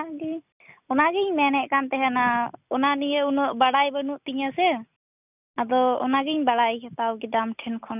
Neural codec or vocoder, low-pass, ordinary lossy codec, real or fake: none; 3.6 kHz; none; real